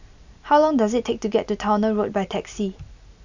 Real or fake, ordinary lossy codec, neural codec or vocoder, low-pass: real; none; none; 7.2 kHz